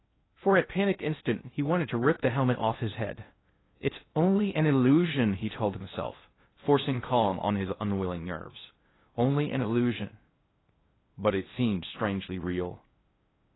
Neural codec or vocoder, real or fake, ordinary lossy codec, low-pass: codec, 16 kHz in and 24 kHz out, 0.6 kbps, FocalCodec, streaming, 2048 codes; fake; AAC, 16 kbps; 7.2 kHz